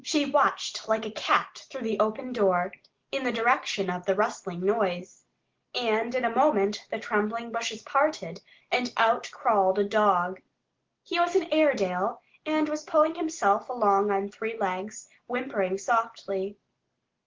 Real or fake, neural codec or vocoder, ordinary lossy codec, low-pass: real; none; Opus, 16 kbps; 7.2 kHz